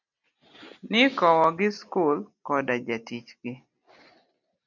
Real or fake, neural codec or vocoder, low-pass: real; none; 7.2 kHz